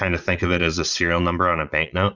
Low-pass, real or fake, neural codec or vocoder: 7.2 kHz; fake; vocoder, 22.05 kHz, 80 mel bands, Vocos